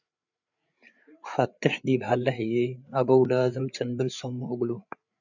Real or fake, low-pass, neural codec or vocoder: fake; 7.2 kHz; codec, 16 kHz, 8 kbps, FreqCodec, larger model